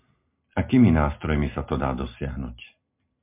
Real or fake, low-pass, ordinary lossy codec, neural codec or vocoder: real; 3.6 kHz; MP3, 32 kbps; none